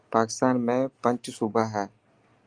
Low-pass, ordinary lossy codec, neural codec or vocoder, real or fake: 9.9 kHz; Opus, 32 kbps; none; real